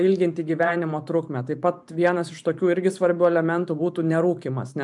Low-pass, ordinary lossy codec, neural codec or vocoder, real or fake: 10.8 kHz; MP3, 96 kbps; vocoder, 44.1 kHz, 128 mel bands every 512 samples, BigVGAN v2; fake